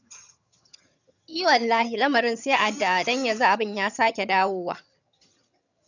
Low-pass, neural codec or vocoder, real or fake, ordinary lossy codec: 7.2 kHz; vocoder, 22.05 kHz, 80 mel bands, HiFi-GAN; fake; none